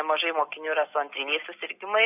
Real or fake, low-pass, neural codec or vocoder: real; 3.6 kHz; none